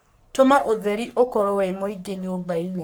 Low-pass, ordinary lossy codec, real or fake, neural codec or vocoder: none; none; fake; codec, 44.1 kHz, 3.4 kbps, Pupu-Codec